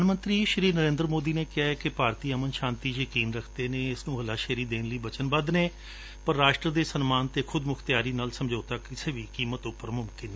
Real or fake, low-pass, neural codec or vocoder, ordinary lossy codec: real; none; none; none